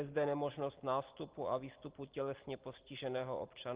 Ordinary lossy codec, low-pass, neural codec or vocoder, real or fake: Opus, 16 kbps; 3.6 kHz; none; real